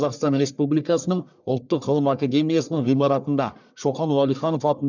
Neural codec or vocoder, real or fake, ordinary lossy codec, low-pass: codec, 44.1 kHz, 1.7 kbps, Pupu-Codec; fake; none; 7.2 kHz